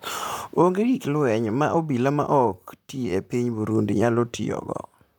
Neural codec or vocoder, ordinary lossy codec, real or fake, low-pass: vocoder, 44.1 kHz, 128 mel bands, Pupu-Vocoder; none; fake; none